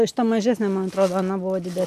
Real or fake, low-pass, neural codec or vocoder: real; 14.4 kHz; none